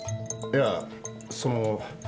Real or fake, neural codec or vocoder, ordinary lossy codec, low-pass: real; none; none; none